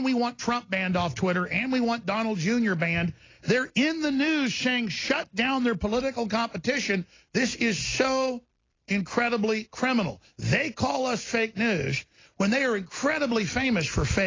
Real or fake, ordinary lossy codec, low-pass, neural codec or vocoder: real; AAC, 32 kbps; 7.2 kHz; none